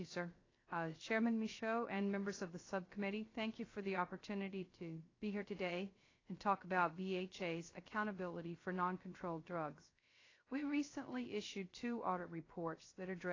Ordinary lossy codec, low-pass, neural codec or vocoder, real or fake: AAC, 32 kbps; 7.2 kHz; codec, 16 kHz, 0.3 kbps, FocalCodec; fake